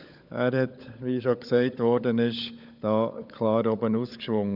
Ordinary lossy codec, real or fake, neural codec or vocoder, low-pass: none; fake; codec, 16 kHz, 16 kbps, FunCodec, trained on LibriTTS, 50 frames a second; 5.4 kHz